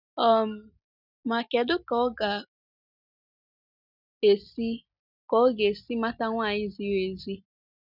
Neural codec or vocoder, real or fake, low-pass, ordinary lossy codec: none; real; 5.4 kHz; none